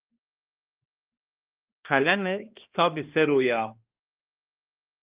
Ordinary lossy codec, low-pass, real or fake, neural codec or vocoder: Opus, 24 kbps; 3.6 kHz; fake; codec, 16 kHz, 1 kbps, X-Codec, HuBERT features, trained on balanced general audio